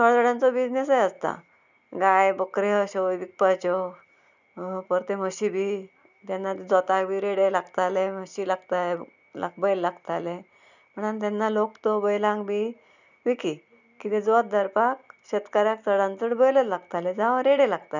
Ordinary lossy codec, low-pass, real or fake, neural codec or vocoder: none; 7.2 kHz; real; none